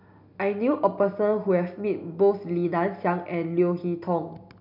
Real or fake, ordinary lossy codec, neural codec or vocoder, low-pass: real; none; none; 5.4 kHz